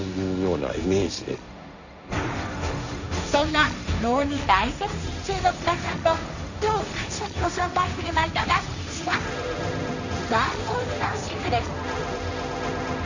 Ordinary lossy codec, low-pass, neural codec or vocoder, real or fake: none; 7.2 kHz; codec, 16 kHz, 1.1 kbps, Voila-Tokenizer; fake